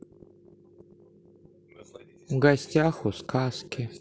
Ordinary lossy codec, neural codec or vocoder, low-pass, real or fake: none; none; none; real